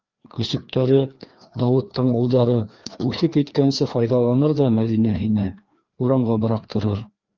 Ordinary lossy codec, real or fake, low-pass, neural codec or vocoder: Opus, 32 kbps; fake; 7.2 kHz; codec, 16 kHz, 2 kbps, FreqCodec, larger model